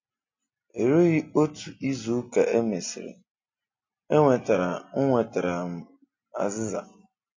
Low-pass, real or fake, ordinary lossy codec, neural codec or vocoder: 7.2 kHz; real; MP3, 32 kbps; none